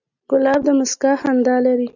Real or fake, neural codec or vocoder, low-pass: real; none; 7.2 kHz